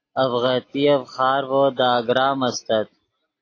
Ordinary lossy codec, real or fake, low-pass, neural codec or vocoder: AAC, 32 kbps; real; 7.2 kHz; none